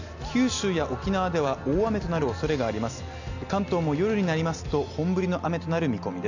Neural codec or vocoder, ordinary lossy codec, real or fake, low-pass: none; none; real; 7.2 kHz